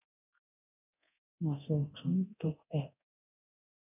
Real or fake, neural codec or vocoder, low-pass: fake; codec, 24 kHz, 0.9 kbps, DualCodec; 3.6 kHz